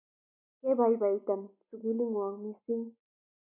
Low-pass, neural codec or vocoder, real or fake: 3.6 kHz; none; real